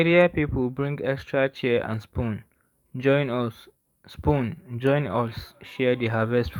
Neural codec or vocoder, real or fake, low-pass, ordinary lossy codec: codec, 44.1 kHz, 7.8 kbps, DAC; fake; 19.8 kHz; none